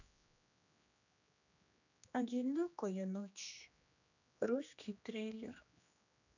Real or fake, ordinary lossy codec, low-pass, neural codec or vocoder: fake; none; 7.2 kHz; codec, 16 kHz, 2 kbps, X-Codec, HuBERT features, trained on general audio